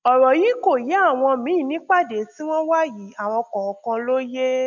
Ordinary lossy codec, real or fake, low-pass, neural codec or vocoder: none; real; 7.2 kHz; none